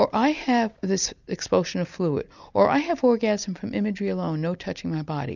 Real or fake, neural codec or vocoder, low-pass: real; none; 7.2 kHz